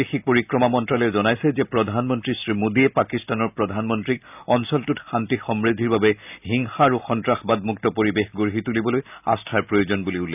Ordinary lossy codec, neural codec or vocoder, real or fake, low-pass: none; none; real; 3.6 kHz